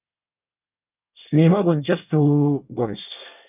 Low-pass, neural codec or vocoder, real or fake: 3.6 kHz; codec, 24 kHz, 1 kbps, SNAC; fake